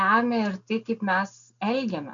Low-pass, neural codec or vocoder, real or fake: 7.2 kHz; none; real